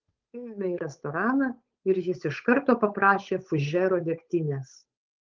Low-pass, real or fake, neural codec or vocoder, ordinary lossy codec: 7.2 kHz; fake; codec, 16 kHz, 8 kbps, FunCodec, trained on Chinese and English, 25 frames a second; Opus, 32 kbps